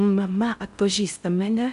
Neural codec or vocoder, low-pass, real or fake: codec, 16 kHz in and 24 kHz out, 0.6 kbps, FocalCodec, streaming, 4096 codes; 10.8 kHz; fake